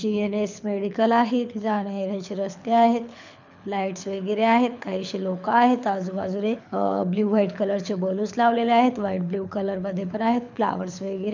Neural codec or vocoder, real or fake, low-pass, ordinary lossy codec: codec, 24 kHz, 6 kbps, HILCodec; fake; 7.2 kHz; none